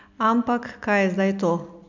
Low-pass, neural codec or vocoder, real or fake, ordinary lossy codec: 7.2 kHz; none; real; none